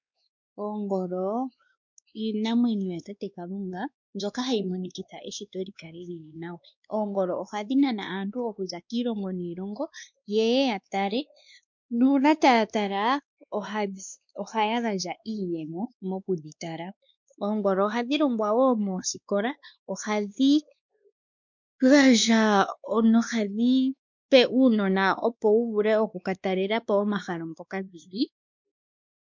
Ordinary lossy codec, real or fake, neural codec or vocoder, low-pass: MP3, 64 kbps; fake; codec, 16 kHz, 2 kbps, X-Codec, WavLM features, trained on Multilingual LibriSpeech; 7.2 kHz